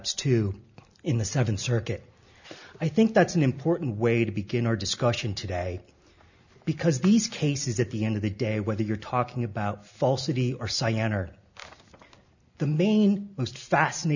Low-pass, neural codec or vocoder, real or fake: 7.2 kHz; none; real